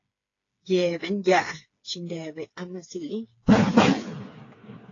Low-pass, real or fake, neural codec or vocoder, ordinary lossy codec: 7.2 kHz; fake; codec, 16 kHz, 4 kbps, FreqCodec, smaller model; AAC, 32 kbps